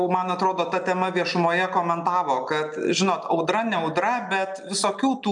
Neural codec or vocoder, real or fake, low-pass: none; real; 10.8 kHz